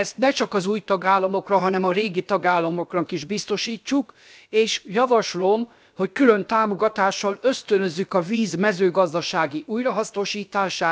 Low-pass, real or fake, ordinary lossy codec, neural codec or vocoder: none; fake; none; codec, 16 kHz, about 1 kbps, DyCAST, with the encoder's durations